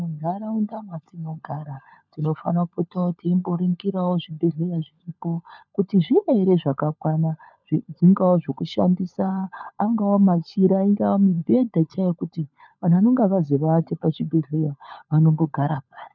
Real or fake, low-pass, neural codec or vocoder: fake; 7.2 kHz; codec, 16 kHz, 16 kbps, FunCodec, trained on LibriTTS, 50 frames a second